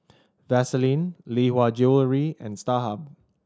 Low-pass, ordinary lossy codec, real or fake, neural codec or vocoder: none; none; real; none